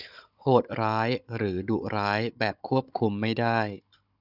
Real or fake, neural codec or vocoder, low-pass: fake; codec, 16 kHz, 16 kbps, FunCodec, trained on Chinese and English, 50 frames a second; 5.4 kHz